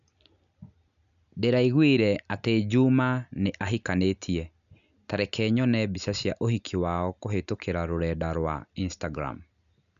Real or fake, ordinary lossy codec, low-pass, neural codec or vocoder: real; none; 7.2 kHz; none